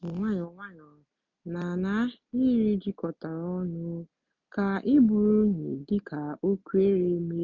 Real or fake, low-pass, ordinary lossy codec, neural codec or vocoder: real; 7.2 kHz; MP3, 64 kbps; none